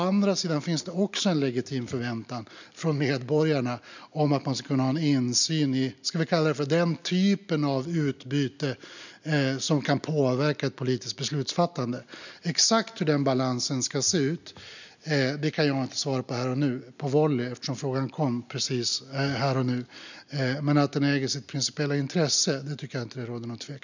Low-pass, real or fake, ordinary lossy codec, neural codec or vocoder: 7.2 kHz; real; none; none